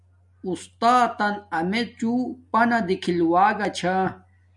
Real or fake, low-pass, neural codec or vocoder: real; 10.8 kHz; none